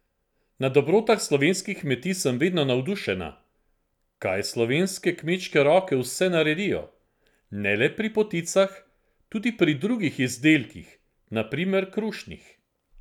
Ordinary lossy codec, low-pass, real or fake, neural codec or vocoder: none; 19.8 kHz; real; none